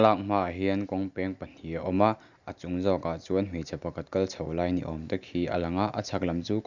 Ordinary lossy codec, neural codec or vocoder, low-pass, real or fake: none; none; 7.2 kHz; real